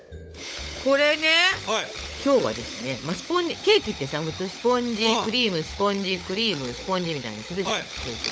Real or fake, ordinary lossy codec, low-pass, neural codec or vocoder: fake; none; none; codec, 16 kHz, 16 kbps, FunCodec, trained on LibriTTS, 50 frames a second